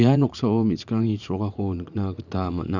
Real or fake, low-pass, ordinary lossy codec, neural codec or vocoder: fake; 7.2 kHz; none; vocoder, 44.1 kHz, 80 mel bands, Vocos